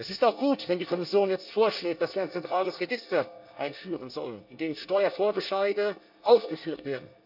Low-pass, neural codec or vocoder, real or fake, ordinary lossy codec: 5.4 kHz; codec, 24 kHz, 1 kbps, SNAC; fake; AAC, 48 kbps